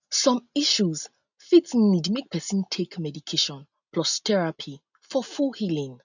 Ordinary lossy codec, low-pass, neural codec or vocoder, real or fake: none; 7.2 kHz; none; real